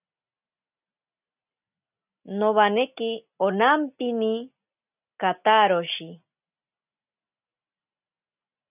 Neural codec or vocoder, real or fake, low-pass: none; real; 3.6 kHz